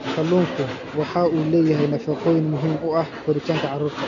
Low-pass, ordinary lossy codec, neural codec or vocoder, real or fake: 7.2 kHz; none; none; real